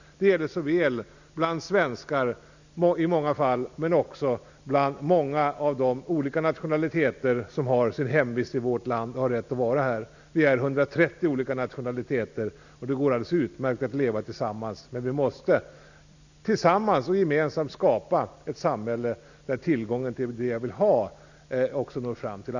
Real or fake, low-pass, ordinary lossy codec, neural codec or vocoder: real; 7.2 kHz; none; none